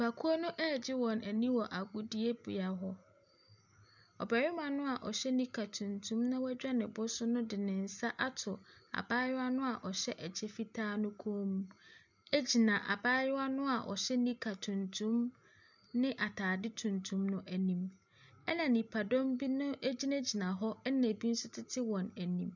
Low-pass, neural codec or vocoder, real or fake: 7.2 kHz; none; real